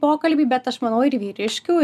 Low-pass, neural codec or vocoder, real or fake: 14.4 kHz; none; real